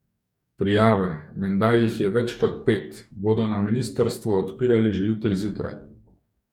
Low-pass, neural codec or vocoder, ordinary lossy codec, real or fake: 19.8 kHz; codec, 44.1 kHz, 2.6 kbps, DAC; none; fake